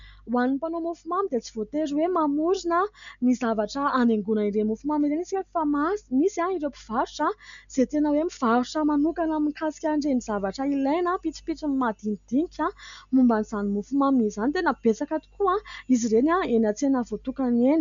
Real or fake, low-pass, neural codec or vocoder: real; 7.2 kHz; none